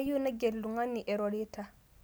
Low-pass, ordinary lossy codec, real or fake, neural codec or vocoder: none; none; real; none